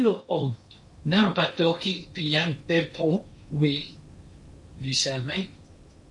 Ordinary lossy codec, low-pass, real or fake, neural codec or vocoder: MP3, 48 kbps; 10.8 kHz; fake; codec, 16 kHz in and 24 kHz out, 0.8 kbps, FocalCodec, streaming, 65536 codes